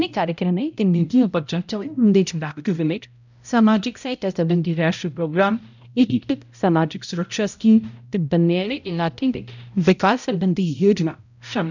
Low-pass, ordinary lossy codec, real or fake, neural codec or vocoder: 7.2 kHz; none; fake; codec, 16 kHz, 0.5 kbps, X-Codec, HuBERT features, trained on balanced general audio